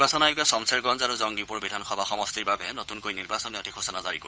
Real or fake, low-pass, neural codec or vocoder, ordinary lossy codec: fake; none; codec, 16 kHz, 8 kbps, FunCodec, trained on Chinese and English, 25 frames a second; none